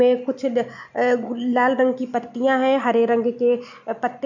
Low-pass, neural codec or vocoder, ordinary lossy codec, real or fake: 7.2 kHz; none; none; real